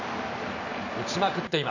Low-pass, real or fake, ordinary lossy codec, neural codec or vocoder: 7.2 kHz; real; none; none